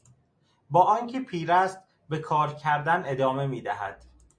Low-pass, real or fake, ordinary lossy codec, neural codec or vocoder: 9.9 kHz; real; MP3, 48 kbps; none